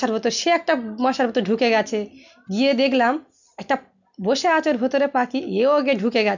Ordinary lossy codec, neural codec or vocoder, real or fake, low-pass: none; none; real; 7.2 kHz